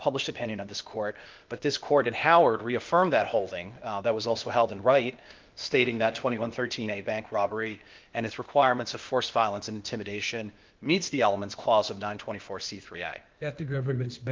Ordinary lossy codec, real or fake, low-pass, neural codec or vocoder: Opus, 32 kbps; fake; 7.2 kHz; codec, 16 kHz, 0.8 kbps, ZipCodec